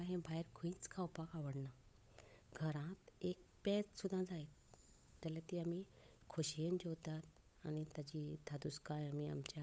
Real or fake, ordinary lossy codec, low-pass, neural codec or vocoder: fake; none; none; codec, 16 kHz, 8 kbps, FunCodec, trained on Chinese and English, 25 frames a second